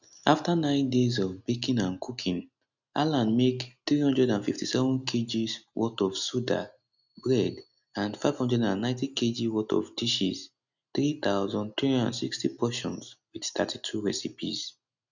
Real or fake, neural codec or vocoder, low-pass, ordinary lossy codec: real; none; 7.2 kHz; none